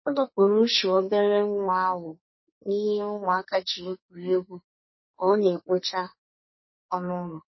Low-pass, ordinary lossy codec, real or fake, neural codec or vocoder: 7.2 kHz; MP3, 24 kbps; fake; codec, 44.1 kHz, 2.6 kbps, SNAC